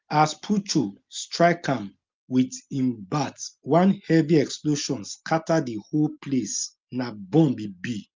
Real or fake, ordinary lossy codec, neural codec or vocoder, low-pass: real; Opus, 24 kbps; none; 7.2 kHz